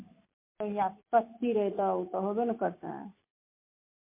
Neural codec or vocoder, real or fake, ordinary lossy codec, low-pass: none; real; MP3, 24 kbps; 3.6 kHz